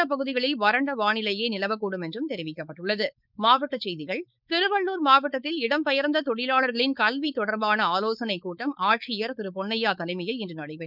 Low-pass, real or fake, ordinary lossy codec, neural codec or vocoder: 5.4 kHz; fake; none; codec, 16 kHz, 4.8 kbps, FACodec